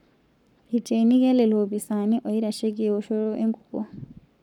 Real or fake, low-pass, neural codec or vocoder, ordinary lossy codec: fake; 19.8 kHz; codec, 44.1 kHz, 7.8 kbps, Pupu-Codec; none